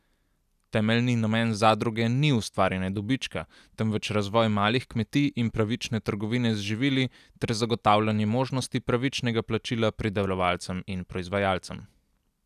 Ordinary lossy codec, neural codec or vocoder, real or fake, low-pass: none; none; real; 14.4 kHz